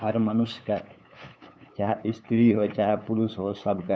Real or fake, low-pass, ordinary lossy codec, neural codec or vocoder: fake; none; none; codec, 16 kHz, 8 kbps, FunCodec, trained on LibriTTS, 25 frames a second